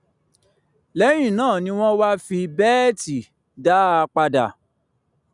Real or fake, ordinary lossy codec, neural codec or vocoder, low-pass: real; none; none; 10.8 kHz